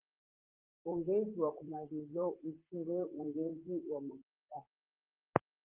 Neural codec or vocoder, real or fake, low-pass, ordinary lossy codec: vocoder, 44.1 kHz, 128 mel bands, Pupu-Vocoder; fake; 3.6 kHz; Opus, 32 kbps